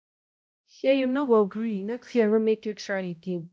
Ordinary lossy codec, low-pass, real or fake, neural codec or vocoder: none; none; fake; codec, 16 kHz, 0.5 kbps, X-Codec, HuBERT features, trained on balanced general audio